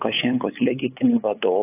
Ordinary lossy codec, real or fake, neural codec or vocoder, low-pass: AAC, 24 kbps; fake; codec, 16 kHz, 8 kbps, FunCodec, trained on LibriTTS, 25 frames a second; 3.6 kHz